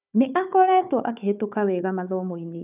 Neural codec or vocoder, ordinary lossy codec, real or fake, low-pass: codec, 16 kHz, 4 kbps, FunCodec, trained on Chinese and English, 50 frames a second; none; fake; 3.6 kHz